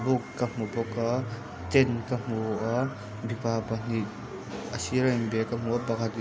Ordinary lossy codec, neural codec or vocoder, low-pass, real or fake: none; none; none; real